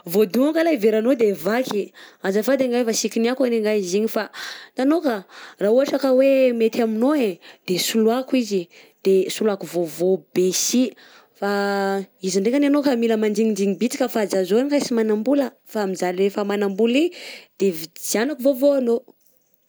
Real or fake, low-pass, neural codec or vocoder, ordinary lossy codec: real; none; none; none